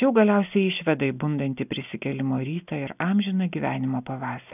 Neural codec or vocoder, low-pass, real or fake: none; 3.6 kHz; real